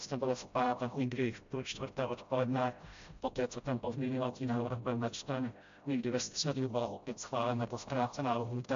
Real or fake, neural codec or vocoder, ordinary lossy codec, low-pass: fake; codec, 16 kHz, 0.5 kbps, FreqCodec, smaller model; AAC, 64 kbps; 7.2 kHz